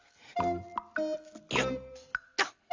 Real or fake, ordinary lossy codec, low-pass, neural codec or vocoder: real; Opus, 32 kbps; 7.2 kHz; none